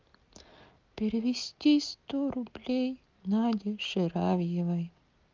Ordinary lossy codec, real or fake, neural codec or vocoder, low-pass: Opus, 24 kbps; real; none; 7.2 kHz